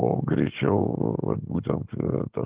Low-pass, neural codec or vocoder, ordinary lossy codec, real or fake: 3.6 kHz; none; Opus, 16 kbps; real